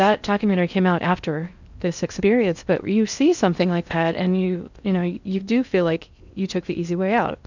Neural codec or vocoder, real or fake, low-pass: codec, 16 kHz in and 24 kHz out, 0.6 kbps, FocalCodec, streaming, 4096 codes; fake; 7.2 kHz